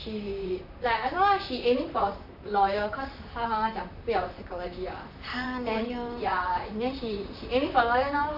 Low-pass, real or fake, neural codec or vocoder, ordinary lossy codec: 5.4 kHz; fake; vocoder, 44.1 kHz, 128 mel bands, Pupu-Vocoder; none